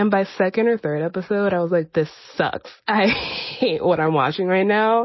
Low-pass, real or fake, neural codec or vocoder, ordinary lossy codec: 7.2 kHz; real; none; MP3, 24 kbps